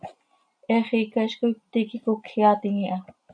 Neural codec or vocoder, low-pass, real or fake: none; 9.9 kHz; real